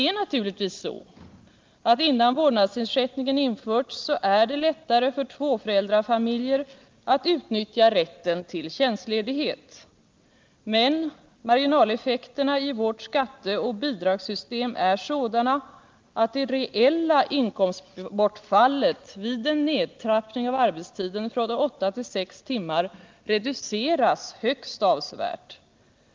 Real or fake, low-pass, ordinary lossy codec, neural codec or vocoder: real; 7.2 kHz; Opus, 32 kbps; none